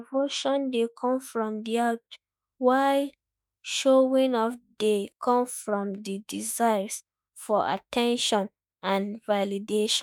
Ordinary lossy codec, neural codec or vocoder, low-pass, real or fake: none; autoencoder, 48 kHz, 32 numbers a frame, DAC-VAE, trained on Japanese speech; none; fake